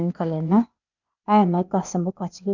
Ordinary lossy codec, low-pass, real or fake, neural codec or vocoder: none; 7.2 kHz; fake; codec, 16 kHz, 0.8 kbps, ZipCodec